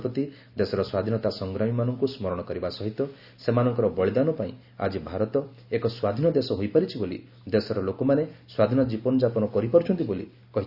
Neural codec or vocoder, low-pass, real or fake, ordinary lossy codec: none; 5.4 kHz; real; Opus, 64 kbps